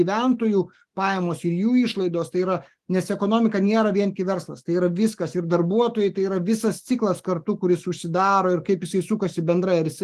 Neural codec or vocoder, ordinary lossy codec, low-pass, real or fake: autoencoder, 48 kHz, 128 numbers a frame, DAC-VAE, trained on Japanese speech; Opus, 24 kbps; 14.4 kHz; fake